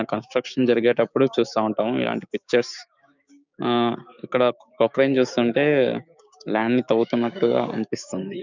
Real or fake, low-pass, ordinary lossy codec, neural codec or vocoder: fake; 7.2 kHz; none; codec, 16 kHz, 6 kbps, DAC